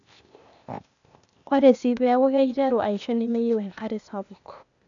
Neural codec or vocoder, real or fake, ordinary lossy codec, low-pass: codec, 16 kHz, 0.8 kbps, ZipCodec; fake; none; 7.2 kHz